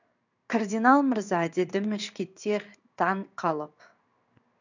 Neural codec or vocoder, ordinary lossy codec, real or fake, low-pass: codec, 16 kHz in and 24 kHz out, 1 kbps, XY-Tokenizer; none; fake; 7.2 kHz